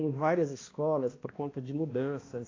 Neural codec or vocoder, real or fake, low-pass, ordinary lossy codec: codec, 16 kHz, 1 kbps, X-Codec, HuBERT features, trained on balanced general audio; fake; 7.2 kHz; AAC, 32 kbps